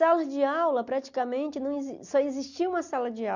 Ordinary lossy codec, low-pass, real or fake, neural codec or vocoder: none; 7.2 kHz; real; none